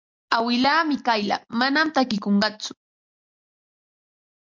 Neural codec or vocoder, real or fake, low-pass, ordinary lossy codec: none; real; 7.2 kHz; MP3, 64 kbps